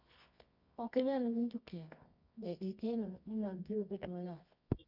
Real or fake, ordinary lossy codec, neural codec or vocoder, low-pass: fake; none; codec, 24 kHz, 0.9 kbps, WavTokenizer, medium music audio release; 5.4 kHz